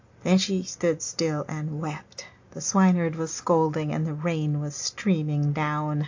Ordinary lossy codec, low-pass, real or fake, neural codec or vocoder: AAC, 48 kbps; 7.2 kHz; real; none